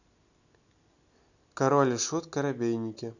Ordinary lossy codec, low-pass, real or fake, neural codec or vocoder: none; 7.2 kHz; real; none